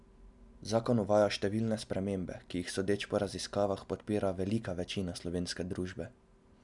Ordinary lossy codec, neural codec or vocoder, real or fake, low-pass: none; none; real; 10.8 kHz